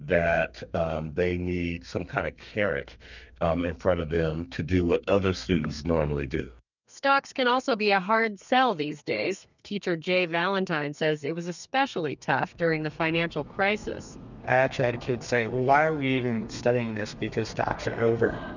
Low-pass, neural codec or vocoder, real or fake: 7.2 kHz; codec, 32 kHz, 1.9 kbps, SNAC; fake